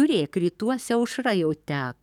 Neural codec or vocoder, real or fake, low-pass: codec, 44.1 kHz, 7.8 kbps, DAC; fake; 19.8 kHz